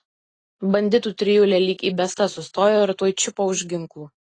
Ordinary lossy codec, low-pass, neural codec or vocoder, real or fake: AAC, 32 kbps; 9.9 kHz; none; real